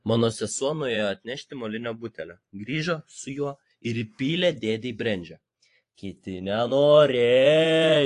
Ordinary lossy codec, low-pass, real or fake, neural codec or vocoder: AAC, 48 kbps; 10.8 kHz; fake; vocoder, 24 kHz, 100 mel bands, Vocos